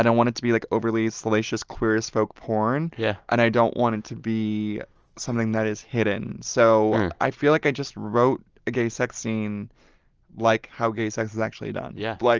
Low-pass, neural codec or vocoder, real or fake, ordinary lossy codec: 7.2 kHz; none; real; Opus, 24 kbps